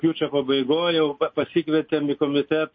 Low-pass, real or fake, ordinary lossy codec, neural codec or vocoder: 7.2 kHz; real; MP3, 32 kbps; none